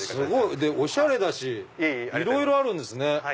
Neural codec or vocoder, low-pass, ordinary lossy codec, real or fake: none; none; none; real